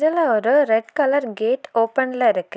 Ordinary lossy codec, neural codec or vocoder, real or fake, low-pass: none; none; real; none